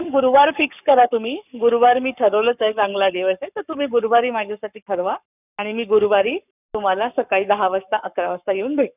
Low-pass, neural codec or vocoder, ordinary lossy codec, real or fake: 3.6 kHz; codec, 44.1 kHz, 7.8 kbps, Pupu-Codec; none; fake